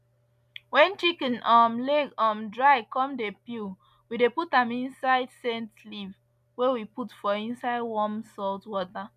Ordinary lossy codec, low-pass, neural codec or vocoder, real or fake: MP3, 96 kbps; 14.4 kHz; none; real